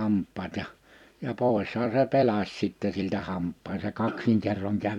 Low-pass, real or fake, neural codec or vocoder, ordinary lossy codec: 19.8 kHz; fake; vocoder, 44.1 kHz, 128 mel bands every 256 samples, BigVGAN v2; none